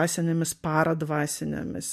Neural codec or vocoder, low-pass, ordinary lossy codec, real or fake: none; 14.4 kHz; MP3, 64 kbps; real